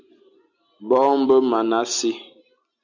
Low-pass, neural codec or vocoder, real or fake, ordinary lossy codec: 7.2 kHz; none; real; MP3, 64 kbps